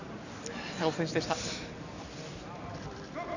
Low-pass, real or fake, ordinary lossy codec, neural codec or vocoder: 7.2 kHz; real; none; none